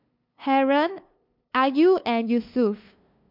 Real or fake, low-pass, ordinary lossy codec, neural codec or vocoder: fake; 5.4 kHz; MP3, 48 kbps; codec, 16 kHz, 2 kbps, FunCodec, trained on LibriTTS, 25 frames a second